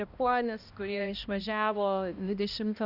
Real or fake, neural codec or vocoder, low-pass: fake; codec, 16 kHz, 1 kbps, X-Codec, HuBERT features, trained on balanced general audio; 5.4 kHz